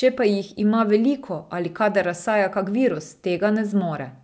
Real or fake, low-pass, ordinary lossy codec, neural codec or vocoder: real; none; none; none